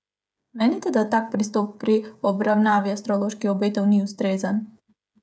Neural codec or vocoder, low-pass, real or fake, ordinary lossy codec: codec, 16 kHz, 16 kbps, FreqCodec, smaller model; none; fake; none